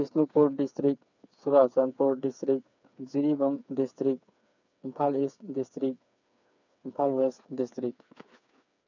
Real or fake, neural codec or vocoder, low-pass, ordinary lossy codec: fake; codec, 16 kHz, 4 kbps, FreqCodec, smaller model; 7.2 kHz; none